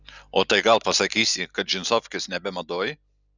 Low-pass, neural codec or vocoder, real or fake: 7.2 kHz; none; real